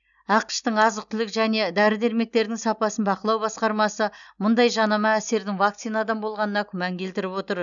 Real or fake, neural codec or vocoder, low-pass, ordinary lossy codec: real; none; 7.2 kHz; none